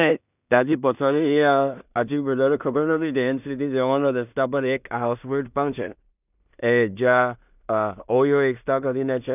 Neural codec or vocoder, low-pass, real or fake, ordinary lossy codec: codec, 16 kHz in and 24 kHz out, 0.4 kbps, LongCat-Audio-Codec, two codebook decoder; 3.6 kHz; fake; none